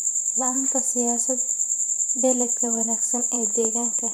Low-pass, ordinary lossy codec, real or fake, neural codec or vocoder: none; none; fake; vocoder, 44.1 kHz, 128 mel bands every 512 samples, BigVGAN v2